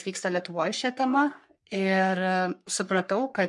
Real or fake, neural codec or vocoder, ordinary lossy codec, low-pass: fake; codec, 44.1 kHz, 3.4 kbps, Pupu-Codec; MP3, 64 kbps; 10.8 kHz